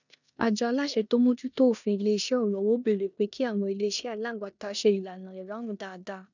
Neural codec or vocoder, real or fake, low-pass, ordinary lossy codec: codec, 16 kHz in and 24 kHz out, 0.9 kbps, LongCat-Audio-Codec, four codebook decoder; fake; 7.2 kHz; none